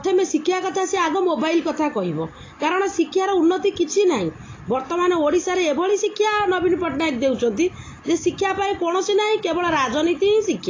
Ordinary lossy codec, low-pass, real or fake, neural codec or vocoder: AAC, 32 kbps; 7.2 kHz; real; none